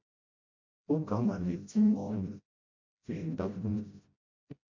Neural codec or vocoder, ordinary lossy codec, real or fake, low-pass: codec, 16 kHz, 0.5 kbps, FreqCodec, smaller model; MP3, 48 kbps; fake; 7.2 kHz